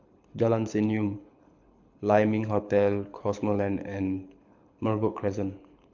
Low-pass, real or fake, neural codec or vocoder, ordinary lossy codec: 7.2 kHz; fake; codec, 24 kHz, 6 kbps, HILCodec; MP3, 64 kbps